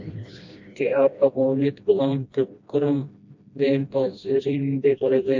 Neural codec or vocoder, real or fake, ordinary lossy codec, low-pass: codec, 16 kHz, 1 kbps, FreqCodec, smaller model; fake; MP3, 48 kbps; 7.2 kHz